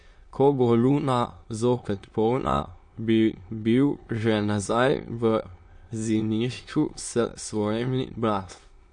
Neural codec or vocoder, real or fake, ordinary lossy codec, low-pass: autoencoder, 22.05 kHz, a latent of 192 numbers a frame, VITS, trained on many speakers; fake; MP3, 48 kbps; 9.9 kHz